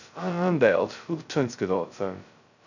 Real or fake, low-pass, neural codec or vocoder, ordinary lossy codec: fake; 7.2 kHz; codec, 16 kHz, 0.2 kbps, FocalCodec; none